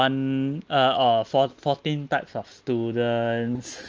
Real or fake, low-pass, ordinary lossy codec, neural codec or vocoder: real; 7.2 kHz; Opus, 24 kbps; none